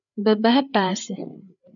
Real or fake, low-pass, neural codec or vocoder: fake; 7.2 kHz; codec, 16 kHz, 8 kbps, FreqCodec, larger model